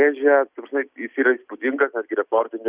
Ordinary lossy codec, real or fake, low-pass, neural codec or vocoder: Opus, 64 kbps; real; 3.6 kHz; none